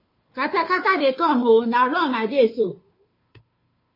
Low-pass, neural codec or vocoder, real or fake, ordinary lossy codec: 5.4 kHz; codec, 16 kHz, 2 kbps, FunCodec, trained on Chinese and English, 25 frames a second; fake; MP3, 24 kbps